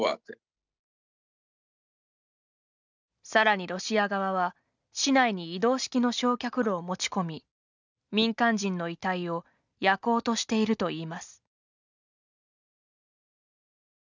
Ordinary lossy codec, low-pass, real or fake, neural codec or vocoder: none; 7.2 kHz; real; none